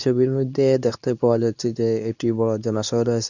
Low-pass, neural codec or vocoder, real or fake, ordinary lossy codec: 7.2 kHz; codec, 24 kHz, 0.9 kbps, WavTokenizer, medium speech release version 2; fake; none